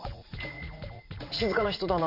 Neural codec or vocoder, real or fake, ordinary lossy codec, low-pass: vocoder, 44.1 kHz, 80 mel bands, Vocos; fake; none; 5.4 kHz